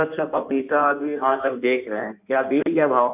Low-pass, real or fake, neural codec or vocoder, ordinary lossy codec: 3.6 kHz; fake; codec, 16 kHz in and 24 kHz out, 1.1 kbps, FireRedTTS-2 codec; none